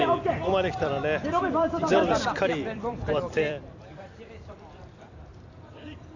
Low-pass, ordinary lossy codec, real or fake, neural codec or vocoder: 7.2 kHz; none; fake; vocoder, 44.1 kHz, 128 mel bands every 256 samples, BigVGAN v2